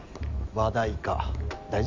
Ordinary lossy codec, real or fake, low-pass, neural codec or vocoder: MP3, 64 kbps; real; 7.2 kHz; none